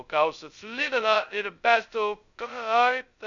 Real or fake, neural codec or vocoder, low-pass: fake; codec, 16 kHz, 0.2 kbps, FocalCodec; 7.2 kHz